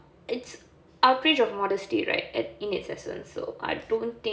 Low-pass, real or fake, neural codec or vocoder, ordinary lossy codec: none; real; none; none